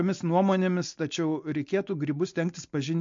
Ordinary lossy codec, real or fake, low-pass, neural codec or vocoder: MP3, 48 kbps; real; 7.2 kHz; none